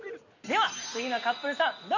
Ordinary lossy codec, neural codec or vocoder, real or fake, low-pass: none; none; real; 7.2 kHz